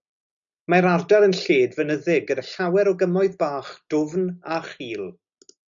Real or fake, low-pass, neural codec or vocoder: real; 7.2 kHz; none